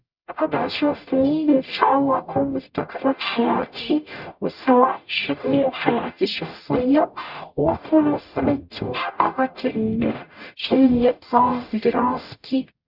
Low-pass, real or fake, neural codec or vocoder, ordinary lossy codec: 5.4 kHz; fake; codec, 44.1 kHz, 0.9 kbps, DAC; none